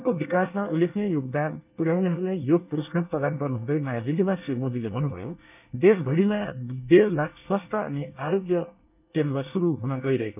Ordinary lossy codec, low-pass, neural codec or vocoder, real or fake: none; 3.6 kHz; codec, 24 kHz, 1 kbps, SNAC; fake